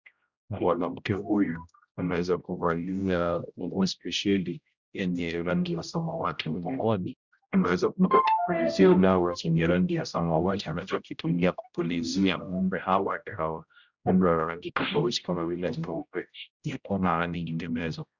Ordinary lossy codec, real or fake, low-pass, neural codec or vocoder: Opus, 64 kbps; fake; 7.2 kHz; codec, 16 kHz, 0.5 kbps, X-Codec, HuBERT features, trained on general audio